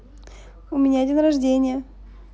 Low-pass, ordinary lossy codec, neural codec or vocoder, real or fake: none; none; none; real